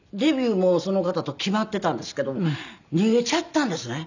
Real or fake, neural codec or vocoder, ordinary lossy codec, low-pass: real; none; none; 7.2 kHz